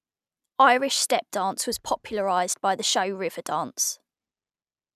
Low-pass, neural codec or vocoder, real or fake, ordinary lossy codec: 14.4 kHz; none; real; none